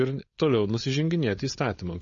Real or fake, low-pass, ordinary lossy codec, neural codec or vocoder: fake; 7.2 kHz; MP3, 32 kbps; codec, 16 kHz, 4.8 kbps, FACodec